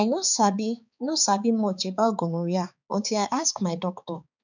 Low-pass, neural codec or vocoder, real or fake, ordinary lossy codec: 7.2 kHz; codec, 16 kHz, 4 kbps, X-Codec, HuBERT features, trained on balanced general audio; fake; none